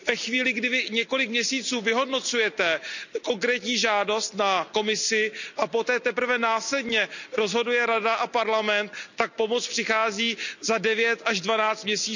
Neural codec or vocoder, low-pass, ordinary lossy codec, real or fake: none; 7.2 kHz; none; real